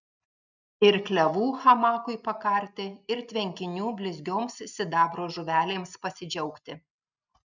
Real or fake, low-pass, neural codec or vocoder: real; 7.2 kHz; none